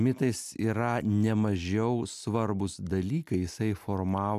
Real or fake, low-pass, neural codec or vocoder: real; 14.4 kHz; none